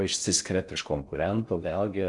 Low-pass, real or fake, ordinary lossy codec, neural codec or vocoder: 10.8 kHz; fake; MP3, 96 kbps; codec, 16 kHz in and 24 kHz out, 0.6 kbps, FocalCodec, streaming, 4096 codes